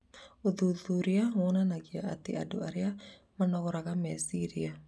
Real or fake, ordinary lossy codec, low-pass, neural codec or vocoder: real; none; none; none